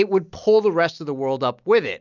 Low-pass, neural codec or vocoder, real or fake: 7.2 kHz; none; real